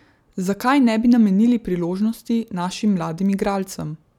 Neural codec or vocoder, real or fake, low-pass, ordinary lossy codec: none; real; 19.8 kHz; none